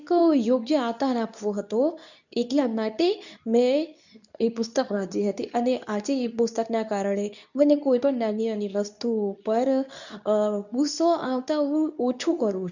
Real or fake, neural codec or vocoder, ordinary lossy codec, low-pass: fake; codec, 24 kHz, 0.9 kbps, WavTokenizer, medium speech release version 2; none; 7.2 kHz